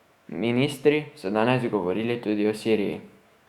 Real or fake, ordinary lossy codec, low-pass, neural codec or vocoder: fake; Opus, 64 kbps; 19.8 kHz; autoencoder, 48 kHz, 128 numbers a frame, DAC-VAE, trained on Japanese speech